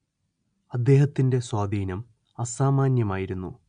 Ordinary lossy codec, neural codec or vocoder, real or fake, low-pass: none; none; real; 9.9 kHz